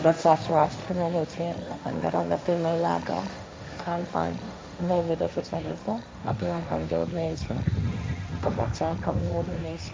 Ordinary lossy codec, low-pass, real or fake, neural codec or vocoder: none; none; fake; codec, 16 kHz, 1.1 kbps, Voila-Tokenizer